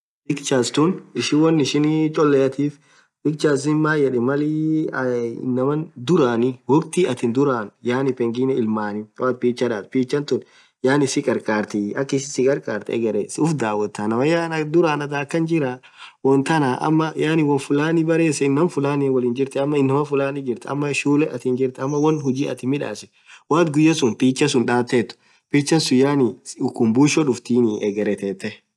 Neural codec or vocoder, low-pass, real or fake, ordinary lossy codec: none; none; real; none